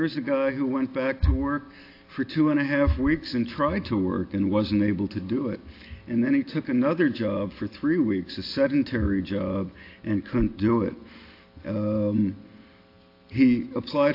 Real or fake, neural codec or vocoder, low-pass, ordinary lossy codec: real; none; 5.4 kHz; AAC, 32 kbps